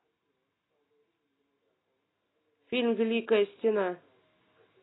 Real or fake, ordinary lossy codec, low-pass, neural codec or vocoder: real; AAC, 16 kbps; 7.2 kHz; none